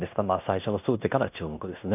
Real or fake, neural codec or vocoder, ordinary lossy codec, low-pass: fake; codec, 16 kHz in and 24 kHz out, 0.9 kbps, LongCat-Audio-Codec, fine tuned four codebook decoder; none; 3.6 kHz